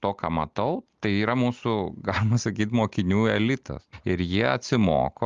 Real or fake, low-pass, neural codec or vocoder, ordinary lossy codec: real; 7.2 kHz; none; Opus, 32 kbps